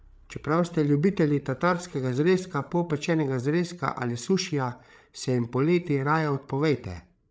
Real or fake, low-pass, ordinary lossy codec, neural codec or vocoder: fake; none; none; codec, 16 kHz, 8 kbps, FreqCodec, larger model